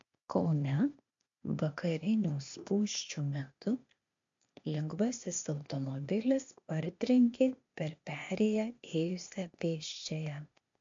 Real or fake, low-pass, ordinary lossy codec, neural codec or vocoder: fake; 7.2 kHz; MP3, 48 kbps; codec, 16 kHz, 0.8 kbps, ZipCodec